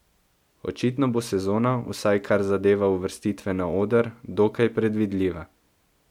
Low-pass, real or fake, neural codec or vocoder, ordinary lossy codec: 19.8 kHz; real; none; MP3, 96 kbps